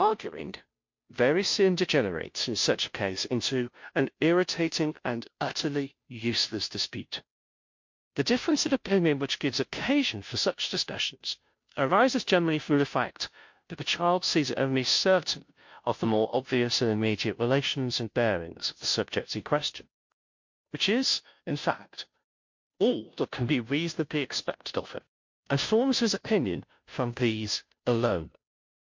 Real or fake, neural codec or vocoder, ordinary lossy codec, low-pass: fake; codec, 16 kHz, 0.5 kbps, FunCodec, trained on Chinese and English, 25 frames a second; MP3, 48 kbps; 7.2 kHz